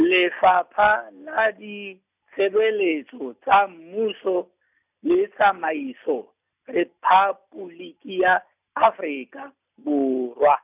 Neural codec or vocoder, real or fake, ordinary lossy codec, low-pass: none; real; none; 3.6 kHz